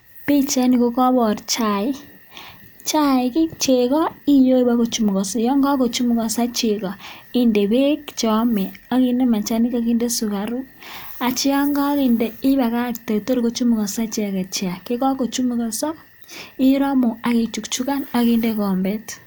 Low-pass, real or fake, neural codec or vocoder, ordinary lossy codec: none; real; none; none